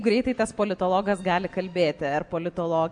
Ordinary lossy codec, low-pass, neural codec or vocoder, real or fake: MP3, 64 kbps; 9.9 kHz; none; real